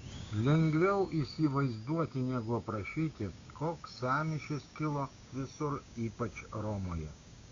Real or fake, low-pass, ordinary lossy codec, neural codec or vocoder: fake; 7.2 kHz; MP3, 64 kbps; codec, 16 kHz, 6 kbps, DAC